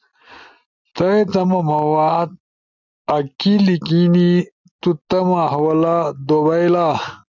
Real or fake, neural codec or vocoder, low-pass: real; none; 7.2 kHz